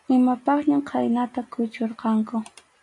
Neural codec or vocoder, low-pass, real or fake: none; 10.8 kHz; real